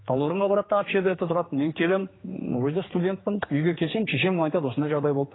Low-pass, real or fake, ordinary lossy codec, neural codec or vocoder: 7.2 kHz; fake; AAC, 16 kbps; codec, 16 kHz, 4 kbps, X-Codec, HuBERT features, trained on general audio